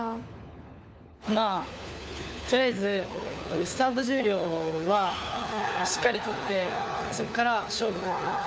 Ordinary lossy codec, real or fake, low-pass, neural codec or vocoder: none; fake; none; codec, 16 kHz, 4 kbps, FunCodec, trained on LibriTTS, 50 frames a second